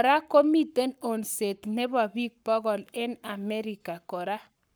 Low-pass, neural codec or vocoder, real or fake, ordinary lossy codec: none; codec, 44.1 kHz, 7.8 kbps, Pupu-Codec; fake; none